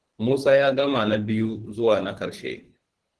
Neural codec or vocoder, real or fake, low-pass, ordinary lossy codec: codec, 24 kHz, 3 kbps, HILCodec; fake; 10.8 kHz; Opus, 16 kbps